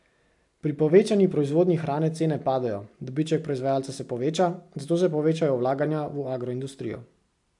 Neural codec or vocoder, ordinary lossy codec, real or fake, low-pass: none; AAC, 64 kbps; real; 10.8 kHz